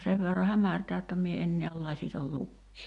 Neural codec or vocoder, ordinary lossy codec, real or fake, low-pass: none; MP3, 64 kbps; real; 10.8 kHz